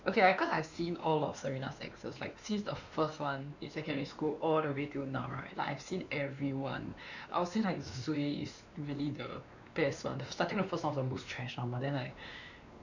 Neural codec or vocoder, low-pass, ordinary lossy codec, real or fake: codec, 16 kHz, 2 kbps, X-Codec, WavLM features, trained on Multilingual LibriSpeech; 7.2 kHz; none; fake